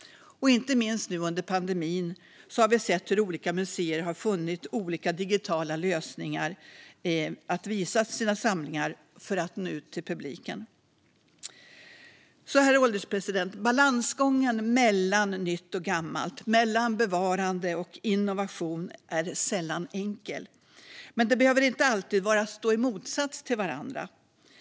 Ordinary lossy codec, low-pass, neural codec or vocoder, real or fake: none; none; none; real